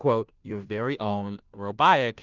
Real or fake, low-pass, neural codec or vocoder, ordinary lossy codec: fake; 7.2 kHz; codec, 16 kHz, 0.5 kbps, FunCodec, trained on Chinese and English, 25 frames a second; Opus, 24 kbps